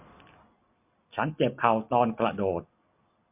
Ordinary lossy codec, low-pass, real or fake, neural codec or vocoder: MP3, 32 kbps; 3.6 kHz; real; none